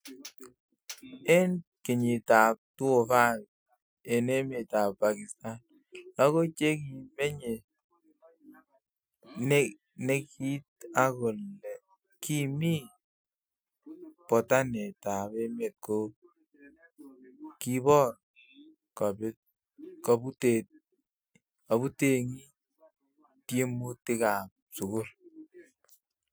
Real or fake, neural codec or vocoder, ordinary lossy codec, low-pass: real; none; none; none